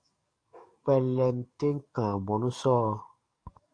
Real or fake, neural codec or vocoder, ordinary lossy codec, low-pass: fake; codec, 44.1 kHz, 7.8 kbps, DAC; Opus, 64 kbps; 9.9 kHz